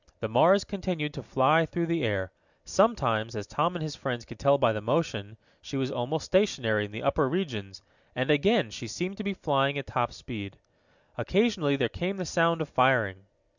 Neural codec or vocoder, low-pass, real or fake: none; 7.2 kHz; real